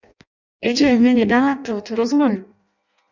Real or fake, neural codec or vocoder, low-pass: fake; codec, 16 kHz in and 24 kHz out, 0.6 kbps, FireRedTTS-2 codec; 7.2 kHz